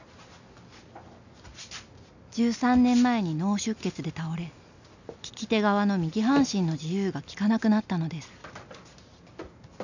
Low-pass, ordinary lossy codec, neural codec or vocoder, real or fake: 7.2 kHz; none; none; real